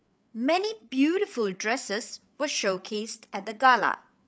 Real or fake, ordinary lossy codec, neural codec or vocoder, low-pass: fake; none; codec, 16 kHz, 8 kbps, FreqCodec, larger model; none